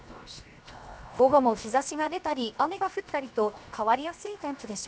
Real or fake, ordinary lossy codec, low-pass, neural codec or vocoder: fake; none; none; codec, 16 kHz, 0.7 kbps, FocalCodec